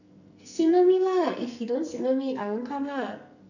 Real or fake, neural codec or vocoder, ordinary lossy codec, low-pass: fake; codec, 44.1 kHz, 2.6 kbps, SNAC; none; 7.2 kHz